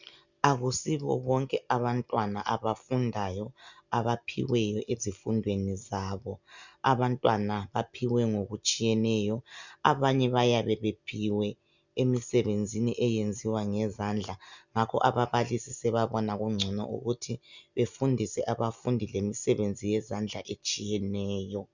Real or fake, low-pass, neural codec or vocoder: real; 7.2 kHz; none